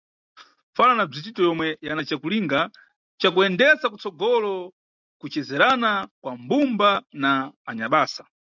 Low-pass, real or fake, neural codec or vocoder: 7.2 kHz; real; none